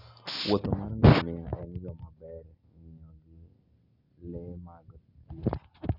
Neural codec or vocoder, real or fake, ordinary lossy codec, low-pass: none; real; none; 5.4 kHz